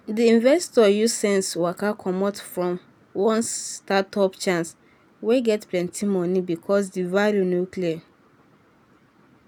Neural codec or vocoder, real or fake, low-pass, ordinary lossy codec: none; real; none; none